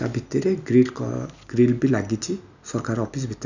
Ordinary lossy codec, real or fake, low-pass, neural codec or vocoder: none; real; 7.2 kHz; none